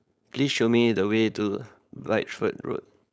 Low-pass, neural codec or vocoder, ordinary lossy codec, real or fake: none; codec, 16 kHz, 4.8 kbps, FACodec; none; fake